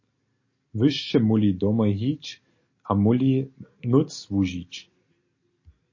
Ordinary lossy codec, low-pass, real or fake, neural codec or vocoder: MP3, 32 kbps; 7.2 kHz; real; none